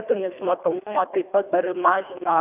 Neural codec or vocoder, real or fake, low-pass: codec, 24 kHz, 1.5 kbps, HILCodec; fake; 3.6 kHz